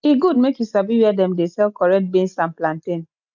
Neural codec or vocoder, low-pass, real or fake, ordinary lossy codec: none; 7.2 kHz; real; none